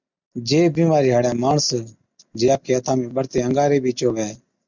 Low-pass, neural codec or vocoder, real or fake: 7.2 kHz; none; real